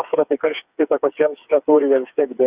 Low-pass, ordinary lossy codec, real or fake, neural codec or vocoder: 3.6 kHz; Opus, 64 kbps; fake; codec, 16 kHz, 4 kbps, FreqCodec, smaller model